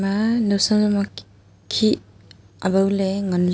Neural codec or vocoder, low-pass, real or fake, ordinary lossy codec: none; none; real; none